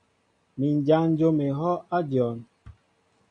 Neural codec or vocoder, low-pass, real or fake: none; 9.9 kHz; real